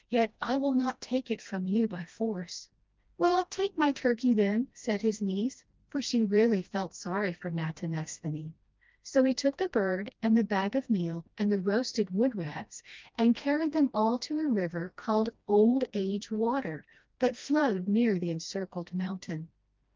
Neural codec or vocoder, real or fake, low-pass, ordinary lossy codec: codec, 16 kHz, 1 kbps, FreqCodec, smaller model; fake; 7.2 kHz; Opus, 24 kbps